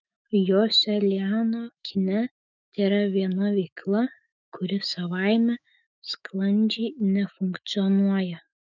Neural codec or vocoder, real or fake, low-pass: autoencoder, 48 kHz, 128 numbers a frame, DAC-VAE, trained on Japanese speech; fake; 7.2 kHz